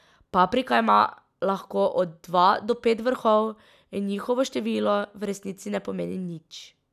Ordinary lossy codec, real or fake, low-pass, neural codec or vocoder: none; real; 14.4 kHz; none